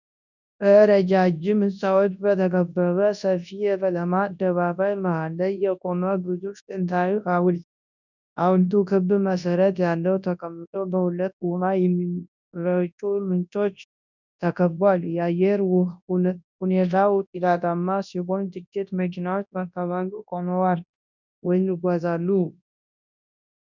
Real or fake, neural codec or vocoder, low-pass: fake; codec, 24 kHz, 0.9 kbps, WavTokenizer, large speech release; 7.2 kHz